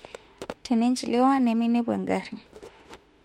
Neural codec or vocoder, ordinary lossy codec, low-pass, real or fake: autoencoder, 48 kHz, 32 numbers a frame, DAC-VAE, trained on Japanese speech; MP3, 64 kbps; 19.8 kHz; fake